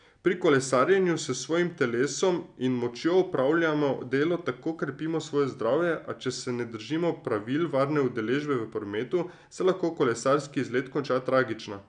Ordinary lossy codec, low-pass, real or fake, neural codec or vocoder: none; 9.9 kHz; real; none